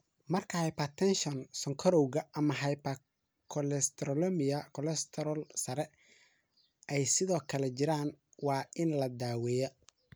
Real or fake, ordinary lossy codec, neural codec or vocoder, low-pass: real; none; none; none